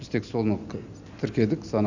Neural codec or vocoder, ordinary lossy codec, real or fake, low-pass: none; none; real; 7.2 kHz